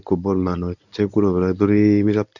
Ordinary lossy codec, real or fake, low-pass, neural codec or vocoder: none; fake; 7.2 kHz; codec, 24 kHz, 0.9 kbps, WavTokenizer, medium speech release version 1